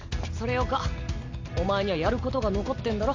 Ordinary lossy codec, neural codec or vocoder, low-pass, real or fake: AAC, 48 kbps; none; 7.2 kHz; real